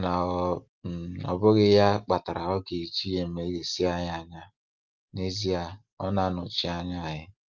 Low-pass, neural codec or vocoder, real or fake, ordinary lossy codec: 7.2 kHz; none; real; Opus, 32 kbps